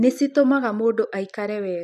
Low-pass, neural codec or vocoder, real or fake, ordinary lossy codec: 14.4 kHz; none; real; none